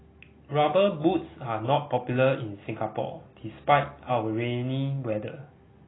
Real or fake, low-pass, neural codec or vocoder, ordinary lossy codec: real; 7.2 kHz; none; AAC, 16 kbps